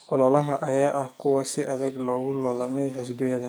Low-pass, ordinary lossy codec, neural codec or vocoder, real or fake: none; none; codec, 44.1 kHz, 2.6 kbps, SNAC; fake